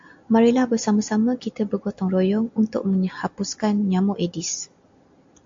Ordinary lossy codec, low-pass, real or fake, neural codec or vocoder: AAC, 64 kbps; 7.2 kHz; real; none